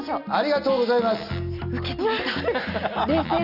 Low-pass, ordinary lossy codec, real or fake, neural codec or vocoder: 5.4 kHz; none; real; none